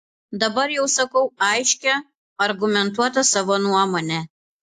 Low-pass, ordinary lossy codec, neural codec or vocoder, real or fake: 14.4 kHz; AAC, 64 kbps; none; real